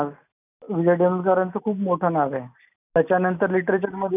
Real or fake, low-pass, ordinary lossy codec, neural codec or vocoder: real; 3.6 kHz; none; none